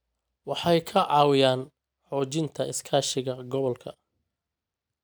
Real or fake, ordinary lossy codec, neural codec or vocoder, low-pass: real; none; none; none